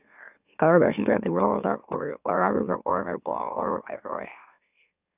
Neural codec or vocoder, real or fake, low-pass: autoencoder, 44.1 kHz, a latent of 192 numbers a frame, MeloTTS; fake; 3.6 kHz